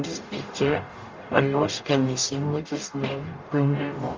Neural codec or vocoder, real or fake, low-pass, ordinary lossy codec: codec, 44.1 kHz, 0.9 kbps, DAC; fake; 7.2 kHz; Opus, 32 kbps